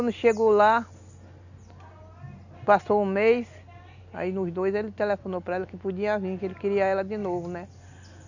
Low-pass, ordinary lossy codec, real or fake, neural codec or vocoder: 7.2 kHz; none; real; none